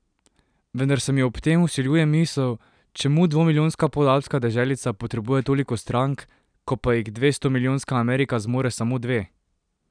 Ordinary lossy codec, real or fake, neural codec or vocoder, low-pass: none; real; none; 9.9 kHz